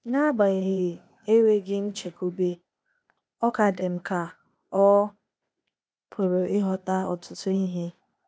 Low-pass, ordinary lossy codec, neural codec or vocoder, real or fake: none; none; codec, 16 kHz, 0.8 kbps, ZipCodec; fake